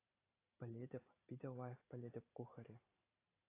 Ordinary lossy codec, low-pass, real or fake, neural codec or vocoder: AAC, 24 kbps; 3.6 kHz; fake; vocoder, 44.1 kHz, 128 mel bands every 512 samples, BigVGAN v2